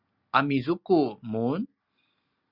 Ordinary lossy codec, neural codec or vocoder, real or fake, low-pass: Opus, 64 kbps; none; real; 5.4 kHz